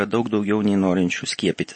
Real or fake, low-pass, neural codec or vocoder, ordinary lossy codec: real; 9.9 kHz; none; MP3, 32 kbps